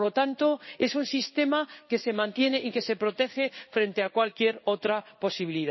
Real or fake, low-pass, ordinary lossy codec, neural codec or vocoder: fake; 7.2 kHz; MP3, 24 kbps; codec, 16 kHz in and 24 kHz out, 1 kbps, XY-Tokenizer